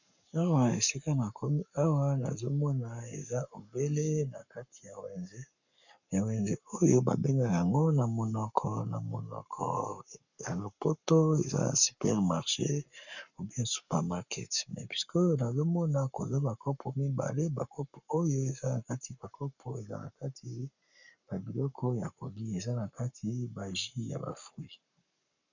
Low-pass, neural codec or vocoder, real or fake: 7.2 kHz; codec, 44.1 kHz, 7.8 kbps, Pupu-Codec; fake